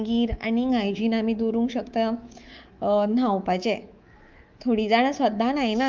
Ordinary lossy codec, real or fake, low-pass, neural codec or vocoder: Opus, 24 kbps; fake; 7.2 kHz; codec, 24 kHz, 3.1 kbps, DualCodec